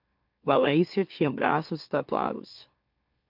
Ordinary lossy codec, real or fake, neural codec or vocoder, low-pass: MP3, 48 kbps; fake; autoencoder, 44.1 kHz, a latent of 192 numbers a frame, MeloTTS; 5.4 kHz